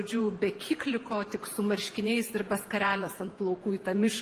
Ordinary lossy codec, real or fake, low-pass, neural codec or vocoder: Opus, 24 kbps; fake; 14.4 kHz; vocoder, 48 kHz, 128 mel bands, Vocos